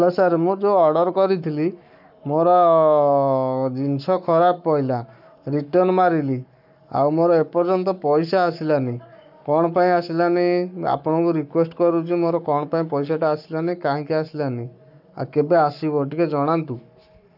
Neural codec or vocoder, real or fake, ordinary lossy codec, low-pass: codec, 44.1 kHz, 7.8 kbps, Pupu-Codec; fake; none; 5.4 kHz